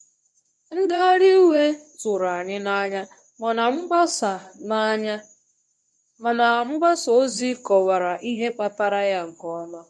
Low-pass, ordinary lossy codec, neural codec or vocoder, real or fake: none; none; codec, 24 kHz, 0.9 kbps, WavTokenizer, medium speech release version 1; fake